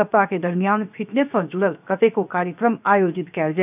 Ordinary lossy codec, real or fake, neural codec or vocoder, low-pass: none; fake; codec, 16 kHz, 0.7 kbps, FocalCodec; 3.6 kHz